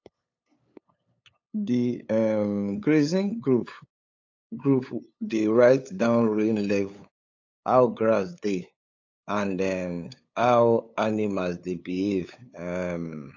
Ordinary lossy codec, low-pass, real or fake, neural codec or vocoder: AAC, 48 kbps; 7.2 kHz; fake; codec, 16 kHz, 8 kbps, FunCodec, trained on LibriTTS, 25 frames a second